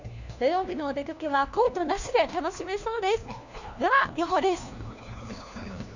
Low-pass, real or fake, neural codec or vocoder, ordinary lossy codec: 7.2 kHz; fake; codec, 16 kHz, 1 kbps, FunCodec, trained on LibriTTS, 50 frames a second; none